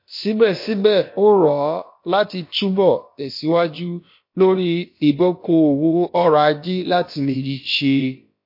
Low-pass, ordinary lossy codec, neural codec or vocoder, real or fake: 5.4 kHz; MP3, 32 kbps; codec, 16 kHz, about 1 kbps, DyCAST, with the encoder's durations; fake